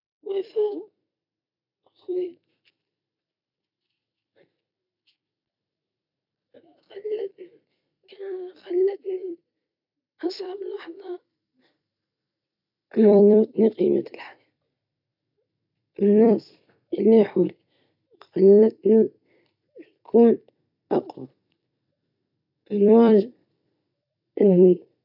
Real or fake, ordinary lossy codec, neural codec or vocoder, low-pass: fake; none; vocoder, 44.1 kHz, 128 mel bands every 256 samples, BigVGAN v2; 5.4 kHz